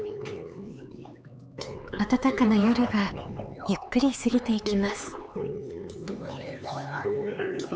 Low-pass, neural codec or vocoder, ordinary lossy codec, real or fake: none; codec, 16 kHz, 4 kbps, X-Codec, HuBERT features, trained on LibriSpeech; none; fake